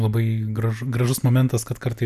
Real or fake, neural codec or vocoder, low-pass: real; none; 14.4 kHz